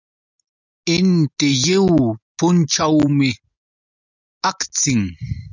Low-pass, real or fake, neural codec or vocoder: 7.2 kHz; real; none